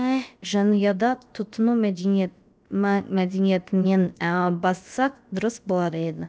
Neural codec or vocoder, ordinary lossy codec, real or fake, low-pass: codec, 16 kHz, about 1 kbps, DyCAST, with the encoder's durations; none; fake; none